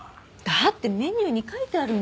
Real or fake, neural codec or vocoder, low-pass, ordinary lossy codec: real; none; none; none